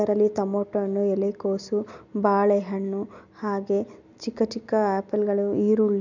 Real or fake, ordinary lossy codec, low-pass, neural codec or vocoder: real; none; 7.2 kHz; none